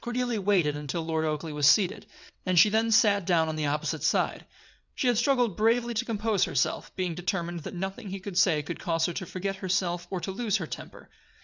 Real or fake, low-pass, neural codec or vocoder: fake; 7.2 kHz; vocoder, 22.05 kHz, 80 mel bands, WaveNeXt